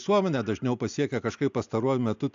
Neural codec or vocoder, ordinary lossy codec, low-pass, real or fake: none; AAC, 64 kbps; 7.2 kHz; real